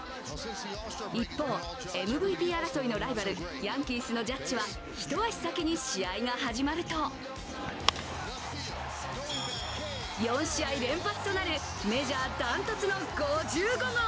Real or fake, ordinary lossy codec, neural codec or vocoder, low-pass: real; none; none; none